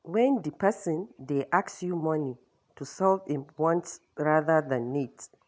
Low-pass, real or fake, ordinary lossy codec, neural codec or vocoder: none; real; none; none